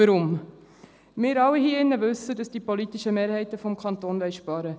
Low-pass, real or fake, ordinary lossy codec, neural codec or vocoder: none; real; none; none